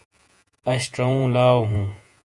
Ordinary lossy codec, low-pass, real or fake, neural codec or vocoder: Opus, 64 kbps; 10.8 kHz; fake; vocoder, 48 kHz, 128 mel bands, Vocos